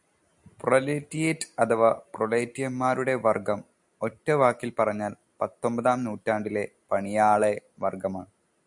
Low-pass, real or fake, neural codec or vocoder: 10.8 kHz; real; none